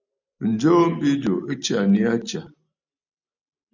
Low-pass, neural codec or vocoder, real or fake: 7.2 kHz; none; real